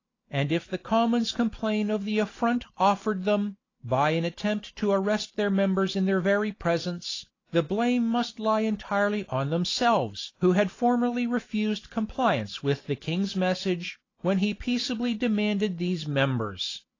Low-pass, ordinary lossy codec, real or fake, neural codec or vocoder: 7.2 kHz; AAC, 32 kbps; real; none